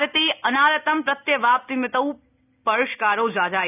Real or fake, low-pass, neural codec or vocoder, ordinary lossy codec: real; 3.6 kHz; none; none